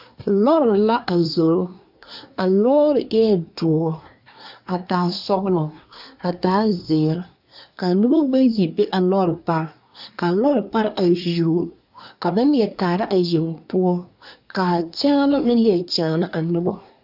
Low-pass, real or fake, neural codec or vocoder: 5.4 kHz; fake; codec, 24 kHz, 1 kbps, SNAC